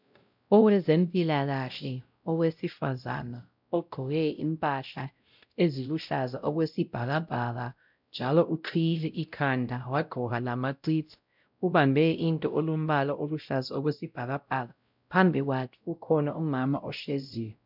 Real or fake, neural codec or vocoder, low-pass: fake; codec, 16 kHz, 0.5 kbps, X-Codec, WavLM features, trained on Multilingual LibriSpeech; 5.4 kHz